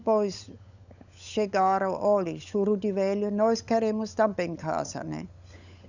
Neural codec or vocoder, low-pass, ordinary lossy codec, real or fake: codec, 16 kHz, 16 kbps, FunCodec, trained on LibriTTS, 50 frames a second; 7.2 kHz; none; fake